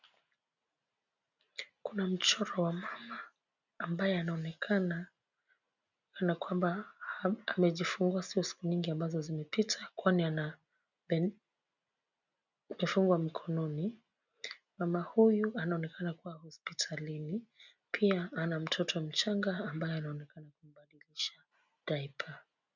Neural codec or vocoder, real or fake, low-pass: none; real; 7.2 kHz